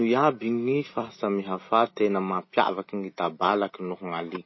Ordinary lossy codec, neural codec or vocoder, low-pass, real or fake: MP3, 24 kbps; none; 7.2 kHz; real